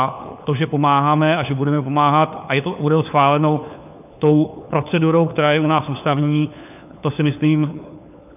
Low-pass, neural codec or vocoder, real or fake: 3.6 kHz; codec, 16 kHz, 4 kbps, FunCodec, trained on LibriTTS, 50 frames a second; fake